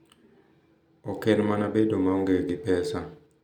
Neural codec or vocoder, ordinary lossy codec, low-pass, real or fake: none; none; 19.8 kHz; real